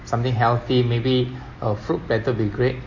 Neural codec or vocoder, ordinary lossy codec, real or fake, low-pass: none; MP3, 32 kbps; real; 7.2 kHz